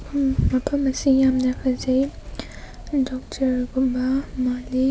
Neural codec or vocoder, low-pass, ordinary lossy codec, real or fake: none; none; none; real